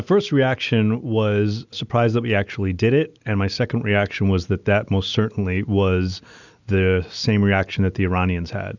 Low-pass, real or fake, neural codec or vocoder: 7.2 kHz; real; none